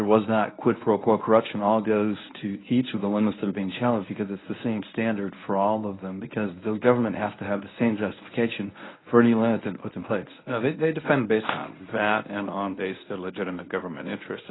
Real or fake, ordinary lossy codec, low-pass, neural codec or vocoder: fake; AAC, 16 kbps; 7.2 kHz; codec, 24 kHz, 0.9 kbps, WavTokenizer, medium speech release version 1